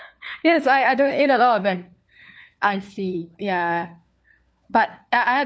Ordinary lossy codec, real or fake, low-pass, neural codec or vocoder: none; fake; none; codec, 16 kHz, 4 kbps, FunCodec, trained on LibriTTS, 50 frames a second